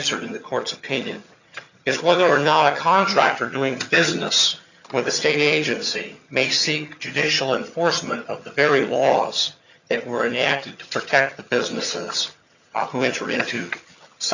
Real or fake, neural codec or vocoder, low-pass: fake; vocoder, 22.05 kHz, 80 mel bands, HiFi-GAN; 7.2 kHz